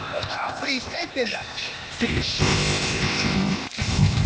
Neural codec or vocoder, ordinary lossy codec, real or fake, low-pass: codec, 16 kHz, 0.8 kbps, ZipCodec; none; fake; none